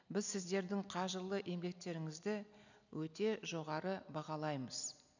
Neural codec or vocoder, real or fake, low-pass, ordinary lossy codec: none; real; 7.2 kHz; none